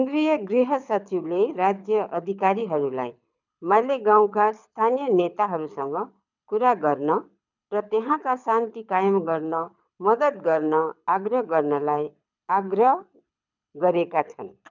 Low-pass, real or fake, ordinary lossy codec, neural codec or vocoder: 7.2 kHz; fake; none; codec, 24 kHz, 6 kbps, HILCodec